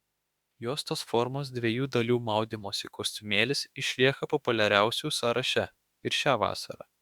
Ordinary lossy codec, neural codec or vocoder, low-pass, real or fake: Opus, 64 kbps; autoencoder, 48 kHz, 32 numbers a frame, DAC-VAE, trained on Japanese speech; 19.8 kHz; fake